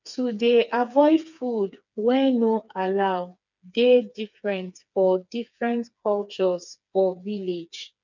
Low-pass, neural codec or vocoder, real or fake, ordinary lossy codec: 7.2 kHz; codec, 16 kHz, 4 kbps, FreqCodec, smaller model; fake; none